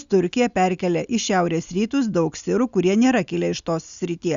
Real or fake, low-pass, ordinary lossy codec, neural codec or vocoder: real; 7.2 kHz; Opus, 64 kbps; none